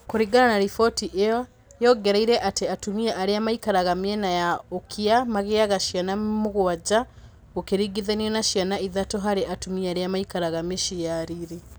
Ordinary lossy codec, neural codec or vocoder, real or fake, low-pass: none; none; real; none